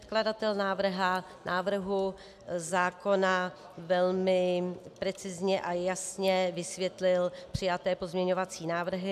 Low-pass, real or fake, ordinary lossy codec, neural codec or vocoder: 14.4 kHz; real; AAC, 96 kbps; none